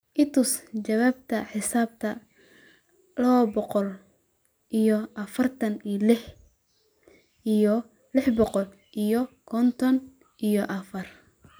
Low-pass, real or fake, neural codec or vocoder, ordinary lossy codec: none; real; none; none